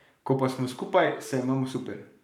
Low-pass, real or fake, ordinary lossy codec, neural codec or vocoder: 19.8 kHz; fake; none; codec, 44.1 kHz, 7.8 kbps, Pupu-Codec